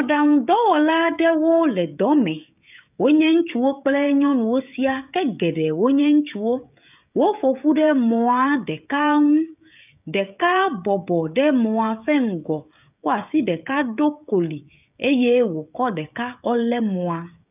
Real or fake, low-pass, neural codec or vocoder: fake; 3.6 kHz; codec, 16 kHz, 16 kbps, FreqCodec, smaller model